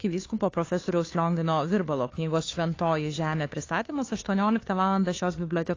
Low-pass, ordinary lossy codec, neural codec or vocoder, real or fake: 7.2 kHz; AAC, 32 kbps; codec, 16 kHz, 2 kbps, FunCodec, trained on Chinese and English, 25 frames a second; fake